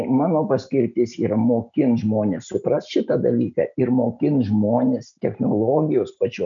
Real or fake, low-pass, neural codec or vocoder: real; 7.2 kHz; none